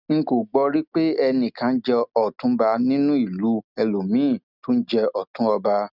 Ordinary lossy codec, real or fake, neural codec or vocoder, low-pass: none; real; none; 5.4 kHz